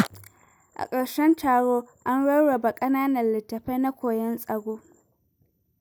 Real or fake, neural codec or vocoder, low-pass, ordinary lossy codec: real; none; none; none